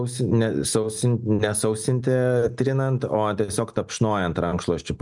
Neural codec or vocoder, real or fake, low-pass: none; real; 10.8 kHz